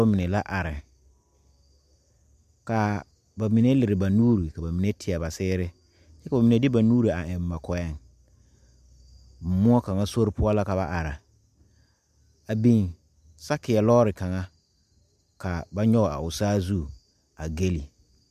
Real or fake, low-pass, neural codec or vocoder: real; 14.4 kHz; none